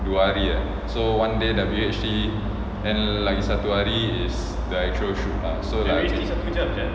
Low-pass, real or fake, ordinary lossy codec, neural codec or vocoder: none; real; none; none